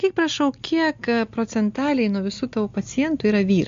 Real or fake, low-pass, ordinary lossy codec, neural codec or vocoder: real; 7.2 kHz; MP3, 48 kbps; none